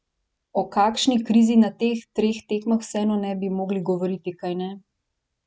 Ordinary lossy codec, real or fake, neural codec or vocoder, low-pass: none; real; none; none